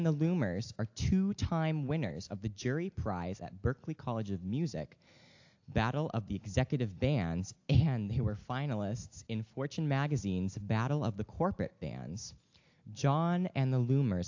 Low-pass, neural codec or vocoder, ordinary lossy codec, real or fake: 7.2 kHz; none; AAC, 48 kbps; real